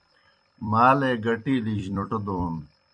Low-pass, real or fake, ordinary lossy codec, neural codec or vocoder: 9.9 kHz; fake; MP3, 64 kbps; vocoder, 44.1 kHz, 128 mel bands every 256 samples, BigVGAN v2